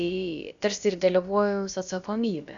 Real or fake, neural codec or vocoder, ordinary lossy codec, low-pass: fake; codec, 16 kHz, about 1 kbps, DyCAST, with the encoder's durations; Opus, 64 kbps; 7.2 kHz